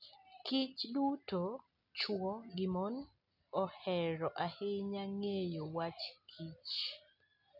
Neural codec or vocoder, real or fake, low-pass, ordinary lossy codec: none; real; 5.4 kHz; none